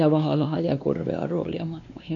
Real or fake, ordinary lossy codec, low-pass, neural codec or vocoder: fake; none; 7.2 kHz; codec, 16 kHz, 2 kbps, X-Codec, WavLM features, trained on Multilingual LibriSpeech